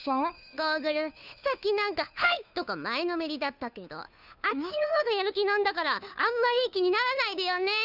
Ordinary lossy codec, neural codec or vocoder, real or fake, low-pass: none; codec, 16 kHz, 2 kbps, FunCodec, trained on Chinese and English, 25 frames a second; fake; 5.4 kHz